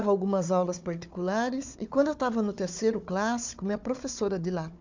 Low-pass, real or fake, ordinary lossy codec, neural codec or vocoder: 7.2 kHz; fake; MP3, 64 kbps; codec, 16 kHz, 4 kbps, FunCodec, trained on Chinese and English, 50 frames a second